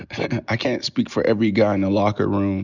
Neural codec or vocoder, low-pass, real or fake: none; 7.2 kHz; real